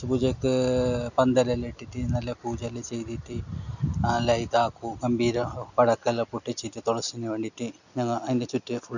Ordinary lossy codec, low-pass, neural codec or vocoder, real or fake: none; 7.2 kHz; none; real